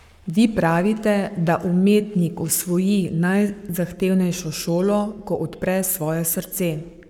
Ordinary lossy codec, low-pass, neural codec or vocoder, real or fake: none; 19.8 kHz; codec, 44.1 kHz, 7.8 kbps, Pupu-Codec; fake